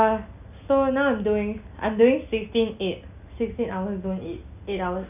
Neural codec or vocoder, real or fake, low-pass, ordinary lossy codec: none; real; 3.6 kHz; none